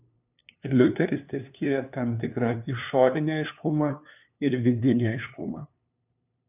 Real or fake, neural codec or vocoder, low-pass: fake; codec, 16 kHz, 2 kbps, FunCodec, trained on LibriTTS, 25 frames a second; 3.6 kHz